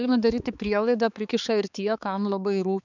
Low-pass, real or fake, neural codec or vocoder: 7.2 kHz; fake; codec, 16 kHz, 4 kbps, X-Codec, HuBERT features, trained on balanced general audio